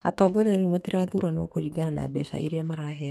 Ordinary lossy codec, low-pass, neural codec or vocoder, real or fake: none; 14.4 kHz; codec, 32 kHz, 1.9 kbps, SNAC; fake